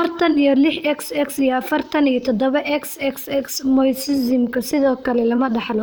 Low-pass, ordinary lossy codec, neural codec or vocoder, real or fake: none; none; vocoder, 44.1 kHz, 128 mel bands, Pupu-Vocoder; fake